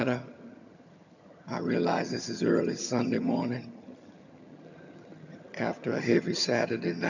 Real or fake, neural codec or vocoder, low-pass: fake; vocoder, 22.05 kHz, 80 mel bands, HiFi-GAN; 7.2 kHz